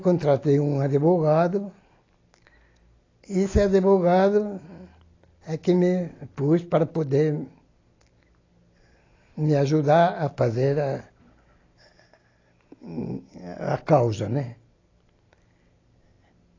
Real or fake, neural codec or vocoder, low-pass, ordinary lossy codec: real; none; 7.2 kHz; AAC, 32 kbps